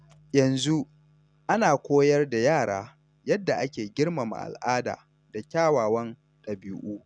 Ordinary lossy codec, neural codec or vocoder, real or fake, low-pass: none; none; real; 9.9 kHz